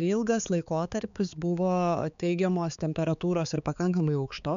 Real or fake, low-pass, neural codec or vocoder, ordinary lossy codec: fake; 7.2 kHz; codec, 16 kHz, 4 kbps, X-Codec, HuBERT features, trained on balanced general audio; MP3, 96 kbps